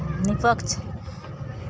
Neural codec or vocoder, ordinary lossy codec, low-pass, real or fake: none; none; none; real